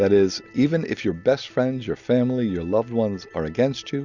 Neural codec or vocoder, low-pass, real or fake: none; 7.2 kHz; real